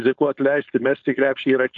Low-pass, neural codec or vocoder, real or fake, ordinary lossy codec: 7.2 kHz; codec, 16 kHz, 4.8 kbps, FACodec; fake; AAC, 64 kbps